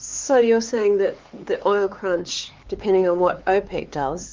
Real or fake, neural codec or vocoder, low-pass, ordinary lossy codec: fake; codec, 16 kHz, 4 kbps, X-Codec, HuBERT features, trained on LibriSpeech; 7.2 kHz; Opus, 32 kbps